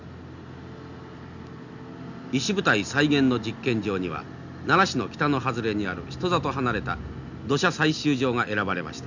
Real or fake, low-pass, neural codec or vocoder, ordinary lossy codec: real; 7.2 kHz; none; none